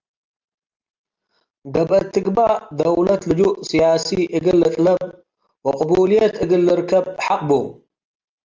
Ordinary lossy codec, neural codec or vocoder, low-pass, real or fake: Opus, 24 kbps; none; 7.2 kHz; real